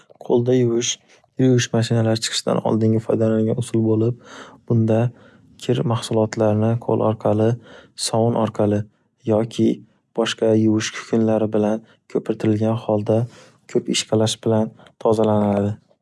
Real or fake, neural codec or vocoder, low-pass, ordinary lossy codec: real; none; none; none